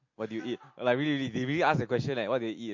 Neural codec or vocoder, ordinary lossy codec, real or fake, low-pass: none; MP3, 48 kbps; real; 7.2 kHz